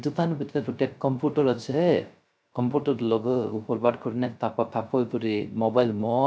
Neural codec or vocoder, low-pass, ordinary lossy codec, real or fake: codec, 16 kHz, 0.3 kbps, FocalCodec; none; none; fake